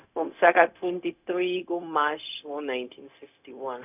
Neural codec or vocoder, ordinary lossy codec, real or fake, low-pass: codec, 16 kHz, 0.4 kbps, LongCat-Audio-Codec; none; fake; 3.6 kHz